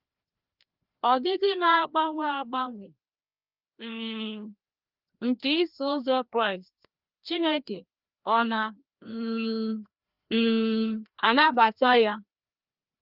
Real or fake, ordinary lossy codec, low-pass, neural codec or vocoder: fake; Opus, 24 kbps; 5.4 kHz; codec, 16 kHz, 1 kbps, FreqCodec, larger model